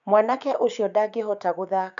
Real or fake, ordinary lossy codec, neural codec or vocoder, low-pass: fake; MP3, 96 kbps; codec, 16 kHz, 6 kbps, DAC; 7.2 kHz